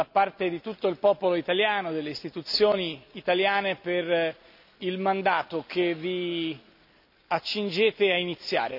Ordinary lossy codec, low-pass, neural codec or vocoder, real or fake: none; 5.4 kHz; none; real